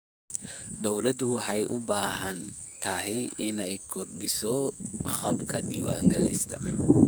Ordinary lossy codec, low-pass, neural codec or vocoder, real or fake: none; none; codec, 44.1 kHz, 2.6 kbps, SNAC; fake